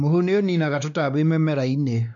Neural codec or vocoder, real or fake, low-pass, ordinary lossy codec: codec, 16 kHz, 2 kbps, X-Codec, WavLM features, trained on Multilingual LibriSpeech; fake; 7.2 kHz; none